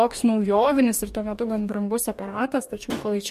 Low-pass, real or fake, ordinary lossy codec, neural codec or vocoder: 14.4 kHz; fake; MP3, 64 kbps; codec, 44.1 kHz, 2.6 kbps, DAC